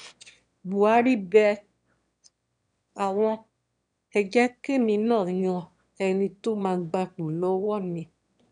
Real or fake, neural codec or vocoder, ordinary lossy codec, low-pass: fake; autoencoder, 22.05 kHz, a latent of 192 numbers a frame, VITS, trained on one speaker; none; 9.9 kHz